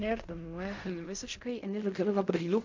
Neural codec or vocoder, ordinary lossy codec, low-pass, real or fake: codec, 16 kHz in and 24 kHz out, 0.4 kbps, LongCat-Audio-Codec, fine tuned four codebook decoder; MP3, 48 kbps; 7.2 kHz; fake